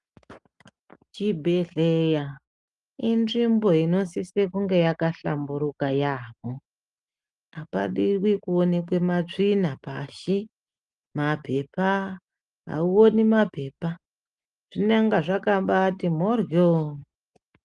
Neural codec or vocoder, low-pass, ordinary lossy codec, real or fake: none; 10.8 kHz; Opus, 32 kbps; real